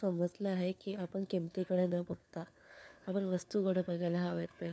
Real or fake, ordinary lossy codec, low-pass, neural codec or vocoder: fake; none; none; codec, 16 kHz, 4 kbps, FunCodec, trained on LibriTTS, 50 frames a second